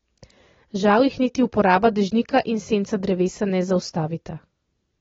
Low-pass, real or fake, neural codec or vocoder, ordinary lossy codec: 7.2 kHz; real; none; AAC, 24 kbps